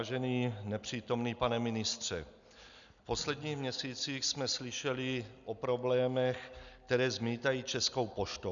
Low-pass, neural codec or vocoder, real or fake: 7.2 kHz; none; real